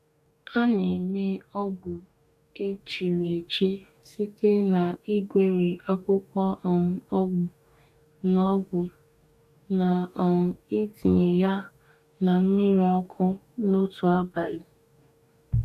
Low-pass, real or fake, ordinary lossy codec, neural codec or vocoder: 14.4 kHz; fake; none; codec, 44.1 kHz, 2.6 kbps, DAC